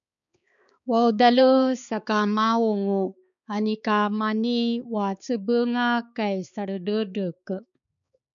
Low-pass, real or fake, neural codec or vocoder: 7.2 kHz; fake; codec, 16 kHz, 4 kbps, X-Codec, HuBERT features, trained on balanced general audio